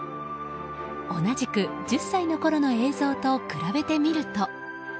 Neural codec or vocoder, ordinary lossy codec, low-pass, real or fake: none; none; none; real